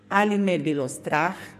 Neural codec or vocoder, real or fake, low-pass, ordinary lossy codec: codec, 44.1 kHz, 2.6 kbps, SNAC; fake; 14.4 kHz; MP3, 64 kbps